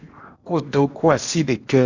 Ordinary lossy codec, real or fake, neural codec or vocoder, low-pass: Opus, 64 kbps; fake; codec, 16 kHz in and 24 kHz out, 0.8 kbps, FocalCodec, streaming, 65536 codes; 7.2 kHz